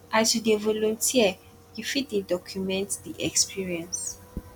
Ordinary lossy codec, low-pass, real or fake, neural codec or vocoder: none; 19.8 kHz; real; none